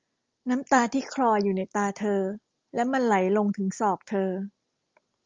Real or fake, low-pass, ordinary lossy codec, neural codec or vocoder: real; 7.2 kHz; Opus, 32 kbps; none